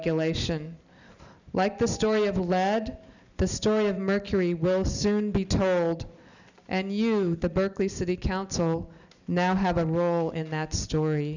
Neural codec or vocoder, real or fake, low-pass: none; real; 7.2 kHz